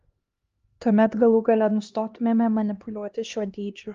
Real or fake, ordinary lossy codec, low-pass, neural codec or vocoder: fake; Opus, 32 kbps; 7.2 kHz; codec, 16 kHz, 1 kbps, X-Codec, HuBERT features, trained on LibriSpeech